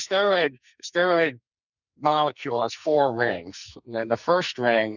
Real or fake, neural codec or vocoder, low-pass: fake; codec, 44.1 kHz, 2.6 kbps, SNAC; 7.2 kHz